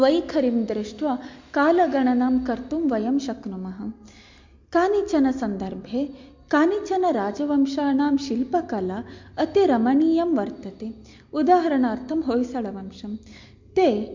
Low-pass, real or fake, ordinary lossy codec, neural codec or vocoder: 7.2 kHz; real; MP3, 48 kbps; none